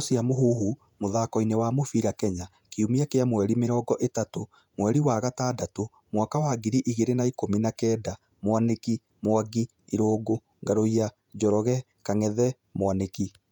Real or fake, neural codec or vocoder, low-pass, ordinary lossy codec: fake; vocoder, 48 kHz, 128 mel bands, Vocos; 19.8 kHz; none